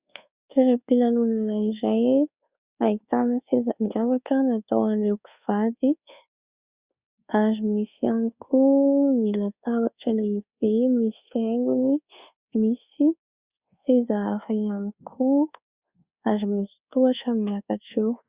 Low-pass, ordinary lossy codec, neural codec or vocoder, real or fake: 3.6 kHz; Opus, 64 kbps; codec, 24 kHz, 1.2 kbps, DualCodec; fake